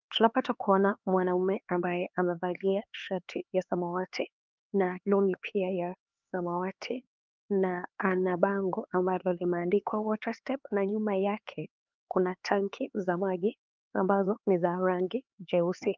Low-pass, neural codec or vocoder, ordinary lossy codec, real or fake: 7.2 kHz; codec, 16 kHz, 4 kbps, X-Codec, HuBERT features, trained on LibriSpeech; Opus, 32 kbps; fake